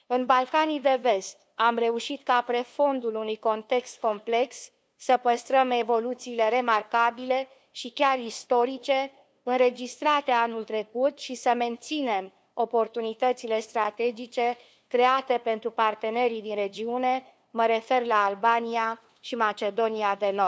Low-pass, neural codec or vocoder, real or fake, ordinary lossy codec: none; codec, 16 kHz, 2 kbps, FunCodec, trained on LibriTTS, 25 frames a second; fake; none